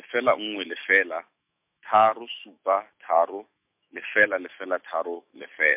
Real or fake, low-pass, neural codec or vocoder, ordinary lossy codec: fake; 3.6 kHz; autoencoder, 48 kHz, 128 numbers a frame, DAC-VAE, trained on Japanese speech; MP3, 32 kbps